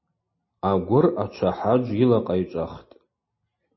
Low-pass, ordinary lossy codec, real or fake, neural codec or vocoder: 7.2 kHz; MP3, 24 kbps; real; none